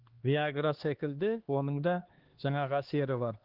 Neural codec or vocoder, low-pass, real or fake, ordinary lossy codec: codec, 16 kHz, 2 kbps, X-Codec, HuBERT features, trained on LibriSpeech; 5.4 kHz; fake; Opus, 16 kbps